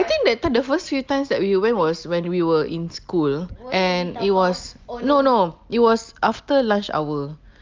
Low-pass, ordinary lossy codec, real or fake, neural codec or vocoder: 7.2 kHz; Opus, 24 kbps; real; none